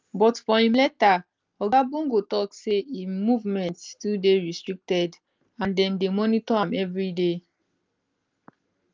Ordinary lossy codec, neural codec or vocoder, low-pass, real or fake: Opus, 32 kbps; none; 7.2 kHz; real